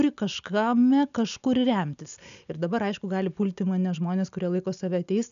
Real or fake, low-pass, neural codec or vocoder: real; 7.2 kHz; none